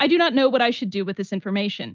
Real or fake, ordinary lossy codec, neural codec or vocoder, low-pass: real; Opus, 24 kbps; none; 7.2 kHz